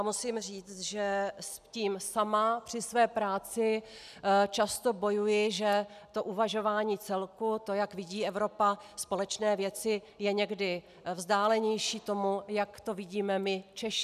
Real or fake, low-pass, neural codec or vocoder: real; 14.4 kHz; none